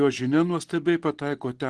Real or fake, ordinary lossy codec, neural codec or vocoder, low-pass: real; Opus, 16 kbps; none; 10.8 kHz